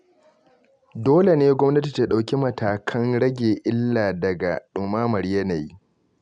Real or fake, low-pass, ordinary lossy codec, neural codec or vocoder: real; 9.9 kHz; none; none